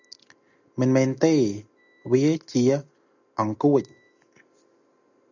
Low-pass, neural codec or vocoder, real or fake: 7.2 kHz; none; real